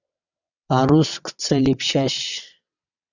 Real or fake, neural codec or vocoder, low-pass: fake; vocoder, 22.05 kHz, 80 mel bands, WaveNeXt; 7.2 kHz